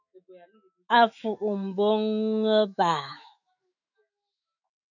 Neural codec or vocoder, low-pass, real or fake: autoencoder, 48 kHz, 128 numbers a frame, DAC-VAE, trained on Japanese speech; 7.2 kHz; fake